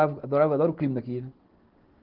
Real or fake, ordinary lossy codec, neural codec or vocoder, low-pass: real; Opus, 16 kbps; none; 5.4 kHz